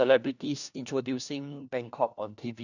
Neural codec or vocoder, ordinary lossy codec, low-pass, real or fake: codec, 16 kHz, 1 kbps, FunCodec, trained on LibriTTS, 50 frames a second; none; 7.2 kHz; fake